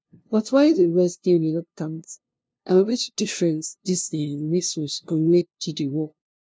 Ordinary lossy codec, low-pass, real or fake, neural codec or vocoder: none; none; fake; codec, 16 kHz, 0.5 kbps, FunCodec, trained on LibriTTS, 25 frames a second